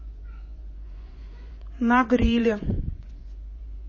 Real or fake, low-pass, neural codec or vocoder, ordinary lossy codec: fake; 7.2 kHz; vocoder, 24 kHz, 100 mel bands, Vocos; MP3, 32 kbps